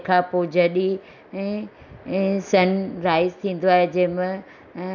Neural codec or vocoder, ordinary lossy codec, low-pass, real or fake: none; none; 7.2 kHz; real